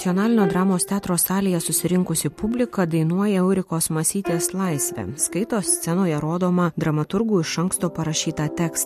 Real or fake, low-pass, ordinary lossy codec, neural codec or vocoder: real; 14.4 kHz; MP3, 64 kbps; none